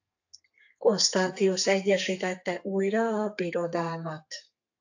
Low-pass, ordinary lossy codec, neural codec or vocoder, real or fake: 7.2 kHz; AAC, 48 kbps; codec, 32 kHz, 1.9 kbps, SNAC; fake